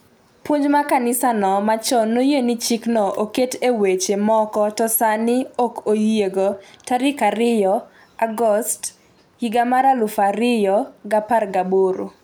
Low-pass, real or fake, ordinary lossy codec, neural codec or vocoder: none; real; none; none